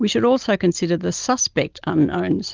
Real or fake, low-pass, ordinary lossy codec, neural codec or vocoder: real; 7.2 kHz; Opus, 24 kbps; none